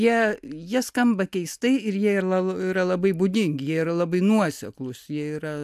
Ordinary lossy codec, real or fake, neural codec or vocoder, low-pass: AAC, 96 kbps; real; none; 14.4 kHz